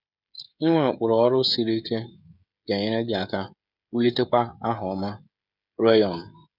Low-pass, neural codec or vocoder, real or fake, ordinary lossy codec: 5.4 kHz; codec, 16 kHz, 16 kbps, FreqCodec, smaller model; fake; none